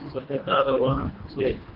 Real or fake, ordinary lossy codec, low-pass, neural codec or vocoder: fake; Opus, 16 kbps; 5.4 kHz; codec, 24 kHz, 1.5 kbps, HILCodec